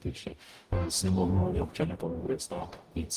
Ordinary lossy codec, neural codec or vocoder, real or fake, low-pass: Opus, 32 kbps; codec, 44.1 kHz, 0.9 kbps, DAC; fake; 14.4 kHz